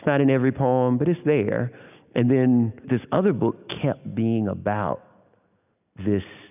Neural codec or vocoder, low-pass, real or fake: none; 3.6 kHz; real